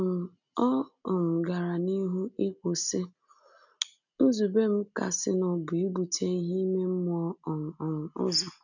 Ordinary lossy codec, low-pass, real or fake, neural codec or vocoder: none; 7.2 kHz; real; none